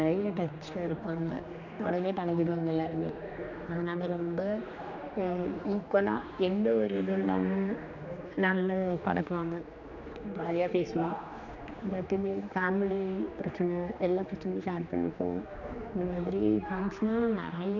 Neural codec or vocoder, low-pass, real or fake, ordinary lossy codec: codec, 16 kHz, 2 kbps, X-Codec, HuBERT features, trained on general audio; 7.2 kHz; fake; none